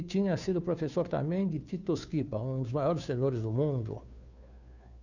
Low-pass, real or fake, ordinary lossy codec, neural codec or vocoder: 7.2 kHz; fake; none; codec, 16 kHz, 2 kbps, FunCodec, trained on Chinese and English, 25 frames a second